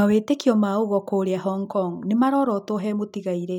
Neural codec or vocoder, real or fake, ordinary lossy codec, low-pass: none; real; none; 19.8 kHz